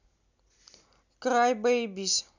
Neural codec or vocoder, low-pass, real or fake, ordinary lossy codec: none; 7.2 kHz; real; none